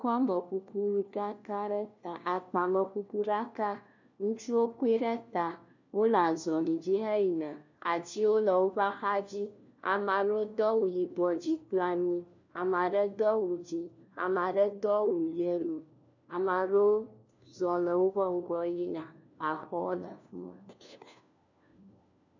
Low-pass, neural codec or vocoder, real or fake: 7.2 kHz; codec, 16 kHz, 1 kbps, FunCodec, trained on LibriTTS, 50 frames a second; fake